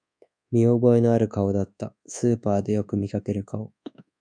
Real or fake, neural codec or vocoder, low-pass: fake; codec, 24 kHz, 1.2 kbps, DualCodec; 9.9 kHz